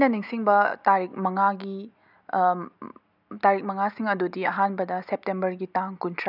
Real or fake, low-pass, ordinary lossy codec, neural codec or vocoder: real; 5.4 kHz; none; none